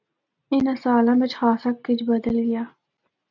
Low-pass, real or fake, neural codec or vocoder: 7.2 kHz; real; none